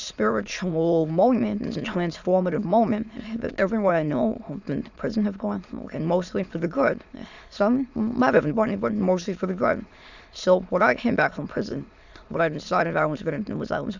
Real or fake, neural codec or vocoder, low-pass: fake; autoencoder, 22.05 kHz, a latent of 192 numbers a frame, VITS, trained on many speakers; 7.2 kHz